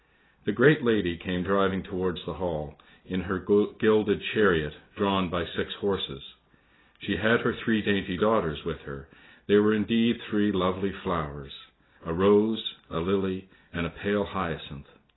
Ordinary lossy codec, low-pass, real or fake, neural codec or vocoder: AAC, 16 kbps; 7.2 kHz; real; none